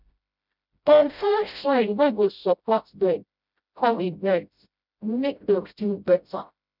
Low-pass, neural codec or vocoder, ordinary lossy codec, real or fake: 5.4 kHz; codec, 16 kHz, 0.5 kbps, FreqCodec, smaller model; none; fake